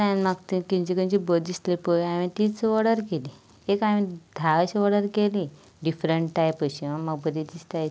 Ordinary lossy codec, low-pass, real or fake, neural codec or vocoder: none; none; real; none